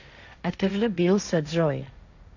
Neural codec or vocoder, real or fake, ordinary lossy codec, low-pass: codec, 16 kHz, 1.1 kbps, Voila-Tokenizer; fake; none; 7.2 kHz